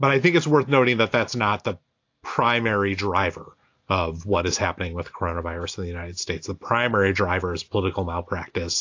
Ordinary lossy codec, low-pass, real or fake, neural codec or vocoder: AAC, 48 kbps; 7.2 kHz; real; none